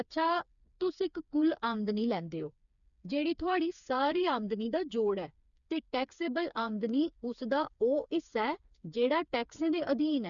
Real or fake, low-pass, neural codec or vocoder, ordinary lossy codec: fake; 7.2 kHz; codec, 16 kHz, 4 kbps, FreqCodec, smaller model; none